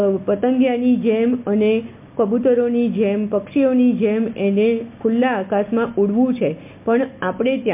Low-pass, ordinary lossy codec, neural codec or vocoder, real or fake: 3.6 kHz; MP3, 32 kbps; none; real